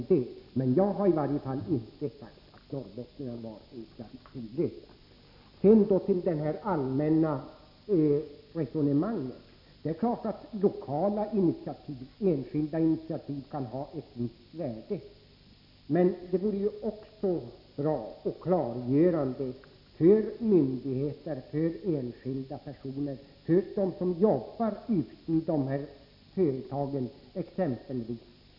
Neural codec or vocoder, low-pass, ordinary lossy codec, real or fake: none; 5.4 kHz; none; real